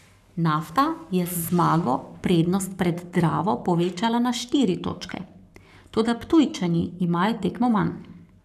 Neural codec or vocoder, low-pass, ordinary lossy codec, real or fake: codec, 44.1 kHz, 7.8 kbps, Pupu-Codec; 14.4 kHz; none; fake